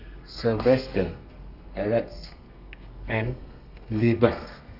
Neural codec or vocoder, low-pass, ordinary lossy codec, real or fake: codec, 32 kHz, 1.9 kbps, SNAC; 5.4 kHz; none; fake